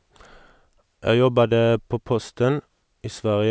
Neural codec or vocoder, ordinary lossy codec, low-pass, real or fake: none; none; none; real